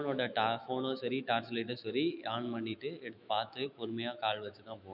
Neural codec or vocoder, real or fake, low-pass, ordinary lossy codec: autoencoder, 48 kHz, 128 numbers a frame, DAC-VAE, trained on Japanese speech; fake; 5.4 kHz; none